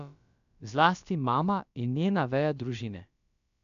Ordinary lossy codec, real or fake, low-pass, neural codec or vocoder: none; fake; 7.2 kHz; codec, 16 kHz, about 1 kbps, DyCAST, with the encoder's durations